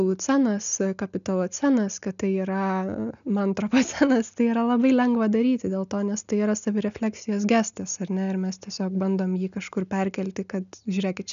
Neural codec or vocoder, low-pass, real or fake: none; 7.2 kHz; real